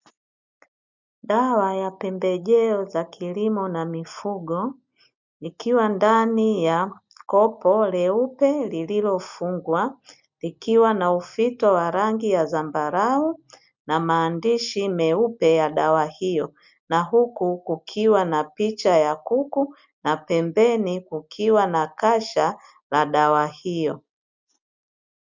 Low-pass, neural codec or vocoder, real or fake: 7.2 kHz; none; real